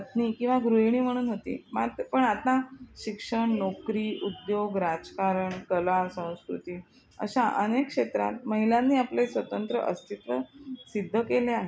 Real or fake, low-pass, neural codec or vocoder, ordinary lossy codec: real; none; none; none